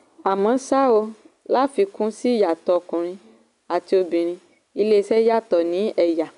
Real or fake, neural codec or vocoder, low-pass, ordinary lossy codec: real; none; 10.8 kHz; none